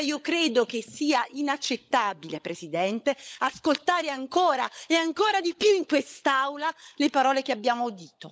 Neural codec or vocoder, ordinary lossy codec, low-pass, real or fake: codec, 16 kHz, 16 kbps, FunCodec, trained on LibriTTS, 50 frames a second; none; none; fake